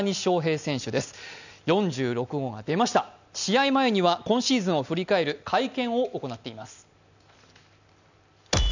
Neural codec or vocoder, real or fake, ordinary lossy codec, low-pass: none; real; none; 7.2 kHz